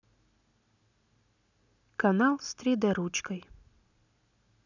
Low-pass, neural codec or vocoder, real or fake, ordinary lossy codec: 7.2 kHz; none; real; none